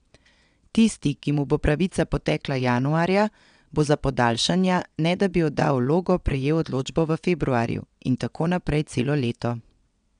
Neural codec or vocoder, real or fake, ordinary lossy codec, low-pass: vocoder, 22.05 kHz, 80 mel bands, WaveNeXt; fake; none; 9.9 kHz